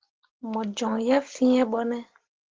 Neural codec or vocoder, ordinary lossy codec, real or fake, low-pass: none; Opus, 16 kbps; real; 7.2 kHz